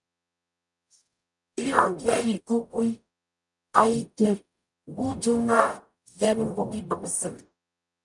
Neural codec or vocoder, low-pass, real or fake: codec, 44.1 kHz, 0.9 kbps, DAC; 10.8 kHz; fake